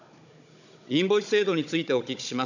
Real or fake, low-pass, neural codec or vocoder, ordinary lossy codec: fake; 7.2 kHz; codec, 16 kHz, 16 kbps, FunCodec, trained on Chinese and English, 50 frames a second; none